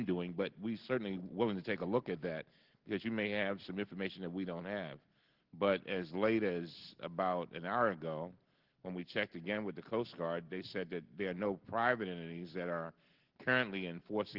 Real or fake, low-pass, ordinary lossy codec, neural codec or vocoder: real; 5.4 kHz; Opus, 16 kbps; none